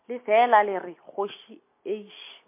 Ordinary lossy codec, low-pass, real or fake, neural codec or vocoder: MP3, 24 kbps; 3.6 kHz; real; none